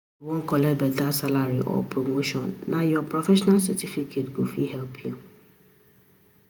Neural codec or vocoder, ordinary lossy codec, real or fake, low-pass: vocoder, 48 kHz, 128 mel bands, Vocos; none; fake; none